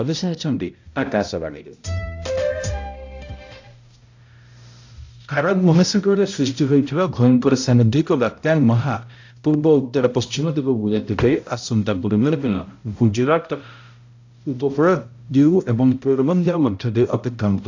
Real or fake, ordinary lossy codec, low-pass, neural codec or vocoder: fake; AAC, 48 kbps; 7.2 kHz; codec, 16 kHz, 0.5 kbps, X-Codec, HuBERT features, trained on balanced general audio